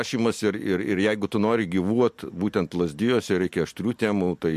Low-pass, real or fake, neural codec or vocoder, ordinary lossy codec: 14.4 kHz; real; none; MP3, 64 kbps